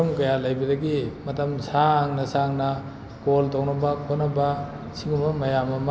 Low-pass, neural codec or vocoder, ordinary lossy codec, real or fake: none; none; none; real